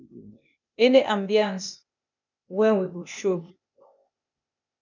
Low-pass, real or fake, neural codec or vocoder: 7.2 kHz; fake; codec, 16 kHz, 0.8 kbps, ZipCodec